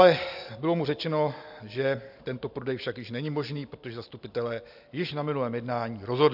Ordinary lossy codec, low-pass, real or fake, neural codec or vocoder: AAC, 48 kbps; 5.4 kHz; real; none